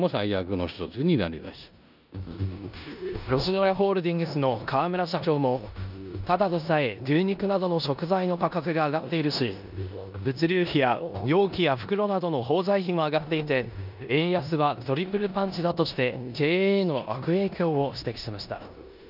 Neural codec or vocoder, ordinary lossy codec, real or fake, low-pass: codec, 16 kHz in and 24 kHz out, 0.9 kbps, LongCat-Audio-Codec, four codebook decoder; none; fake; 5.4 kHz